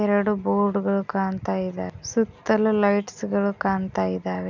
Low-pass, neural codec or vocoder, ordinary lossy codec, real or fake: 7.2 kHz; none; none; real